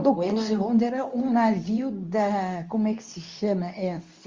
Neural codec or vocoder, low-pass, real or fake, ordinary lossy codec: codec, 24 kHz, 0.9 kbps, WavTokenizer, medium speech release version 2; 7.2 kHz; fake; Opus, 32 kbps